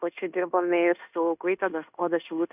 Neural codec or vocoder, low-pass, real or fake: codec, 16 kHz in and 24 kHz out, 0.9 kbps, LongCat-Audio-Codec, fine tuned four codebook decoder; 3.6 kHz; fake